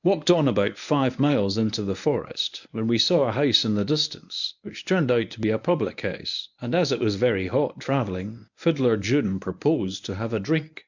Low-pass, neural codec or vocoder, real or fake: 7.2 kHz; codec, 24 kHz, 0.9 kbps, WavTokenizer, medium speech release version 1; fake